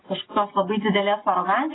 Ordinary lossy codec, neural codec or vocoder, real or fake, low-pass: AAC, 16 kbps; none; real; 7.2 kHz